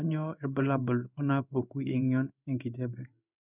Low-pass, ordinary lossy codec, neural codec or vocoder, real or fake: 3.6 kHz; none; codec, 16 kHz in and 24 kHz out, 1 kbps, XY-Tokenizer; fake